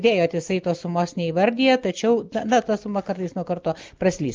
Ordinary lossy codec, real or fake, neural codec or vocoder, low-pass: Opus, 24 kbps; real; none; 7.2 kHz